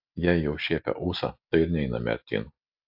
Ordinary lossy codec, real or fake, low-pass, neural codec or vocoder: AAC, 48 kbps; real; 5.4 kHz; none